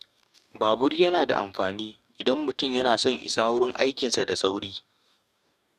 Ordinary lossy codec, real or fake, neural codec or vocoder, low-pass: none; fake; codec, 44.1 kHz, 2.6 kbps, DAC; 14.4 kHz